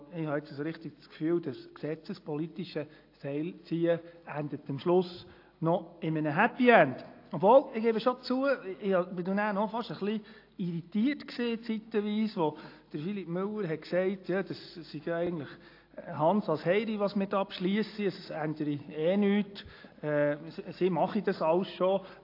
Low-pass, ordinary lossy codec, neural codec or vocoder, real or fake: 5.4 kHz; AAC, 32 kbps; none; real